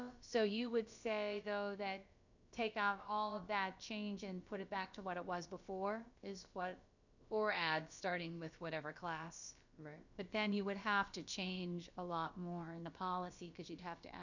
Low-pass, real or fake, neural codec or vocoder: 7.2 kHz; fake; codec, 16 kHz, about 1 kbps, DyCAST, with the encoder's durations